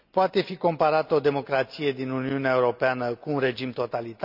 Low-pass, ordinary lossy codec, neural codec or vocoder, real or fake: 5.4 kHz; none; none; real